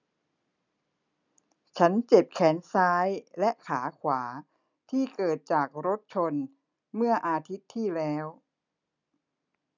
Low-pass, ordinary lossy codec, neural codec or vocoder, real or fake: 7.2 kHz; none; none; real